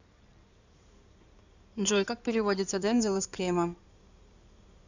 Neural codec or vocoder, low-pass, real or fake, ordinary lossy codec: codec, 16 kHz in and 24 kHz out, 2.2 kbps, FireRedTTS-2 codec; 7.2 kHz; fake; none